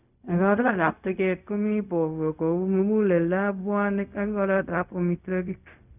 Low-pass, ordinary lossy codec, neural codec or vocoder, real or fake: 3.6 kHz; none; codec, 16 kHz, 0.4 kbps, LongCat-Audio-Codec; fake